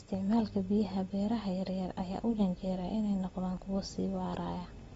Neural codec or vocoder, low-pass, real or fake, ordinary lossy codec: none; 19.8 kHz; real; AAC, 24 kbps